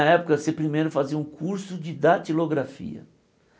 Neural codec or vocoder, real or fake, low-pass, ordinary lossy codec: none; real; none; none